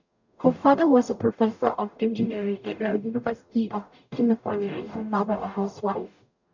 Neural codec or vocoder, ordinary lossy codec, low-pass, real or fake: codec, 44.1 kHz, 0.9 kbps, DAC; none; 7.2 kHz; fake